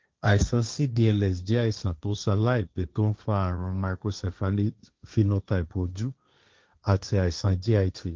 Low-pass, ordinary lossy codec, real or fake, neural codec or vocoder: 7.2 kHz; Opus, 24 kbps; fake; codec, 16 kHz, 1.1 kbps, Voila-Tokenizer